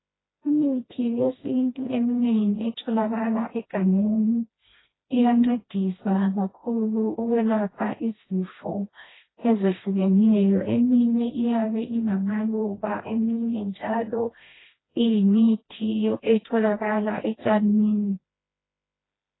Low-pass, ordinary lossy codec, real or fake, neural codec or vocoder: 7.2 kHz; AAC, 16 kbps; fake; codec, 16 kHz, 1 kbps, FreqCodec, smaller model